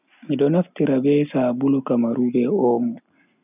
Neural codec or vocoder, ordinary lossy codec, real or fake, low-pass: none; none; real; 3.6 kHz